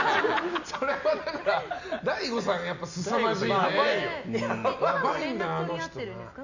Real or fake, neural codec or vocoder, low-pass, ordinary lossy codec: real; none; 7.2 kHz; none